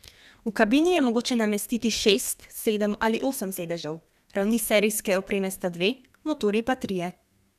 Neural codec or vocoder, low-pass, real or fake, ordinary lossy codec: codec, 32 kHz, 1.9 kbps, SNAC; 14.4 kHz; fake; none